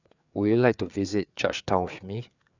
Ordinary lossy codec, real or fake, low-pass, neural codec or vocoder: none; fake; 7.2 kHz; codec, 16 kHz, 4 kbps, FreqCodec, larger model